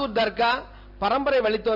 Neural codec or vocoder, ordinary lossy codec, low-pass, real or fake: none; none; 5.4 kHz; real